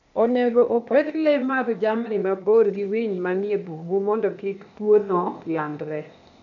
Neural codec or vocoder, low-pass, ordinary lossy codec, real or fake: codec, 16 kHz, 0.8 kbps, ZipCodec; 7.2 kHz; none; fake